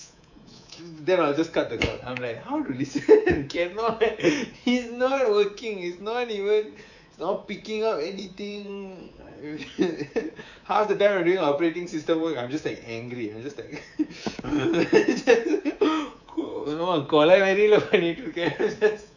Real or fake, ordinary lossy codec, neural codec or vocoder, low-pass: fake; none; codec, 24 kHz, 3.1 kbps, DualCodec; 7.2 kHz